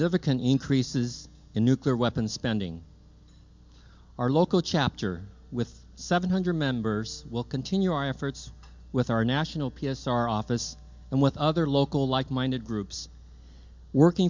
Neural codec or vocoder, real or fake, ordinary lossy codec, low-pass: none; real; MP3, 64 kbps; 7.2 kHz